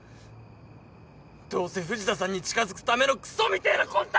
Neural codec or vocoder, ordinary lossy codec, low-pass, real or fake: none; none; none; real